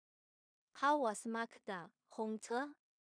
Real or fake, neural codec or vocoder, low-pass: fake; codec, 16 kHz in and 24 kHz out, 0.4 kbps, LongCat-Audio-Codec, two codebook decoder; 9.9 kHz